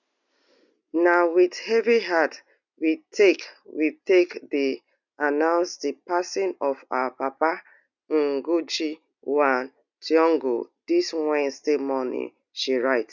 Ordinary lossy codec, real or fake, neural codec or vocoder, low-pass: none; real; none; 7.2 kHz